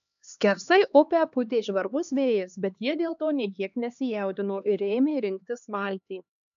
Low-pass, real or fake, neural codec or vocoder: 7.2 kHz; fake; codec, 16 kHz, 2 kbps, X-Codec, HuBERT features, trained on LibriSpeech